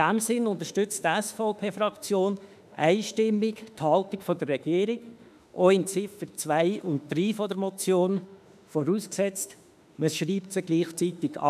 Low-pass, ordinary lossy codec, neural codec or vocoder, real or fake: 14.4 kHz; none; autoencoder, 48 kHz, 32 numbers a frame, DAC-VAE, trained on Japanese speech; fake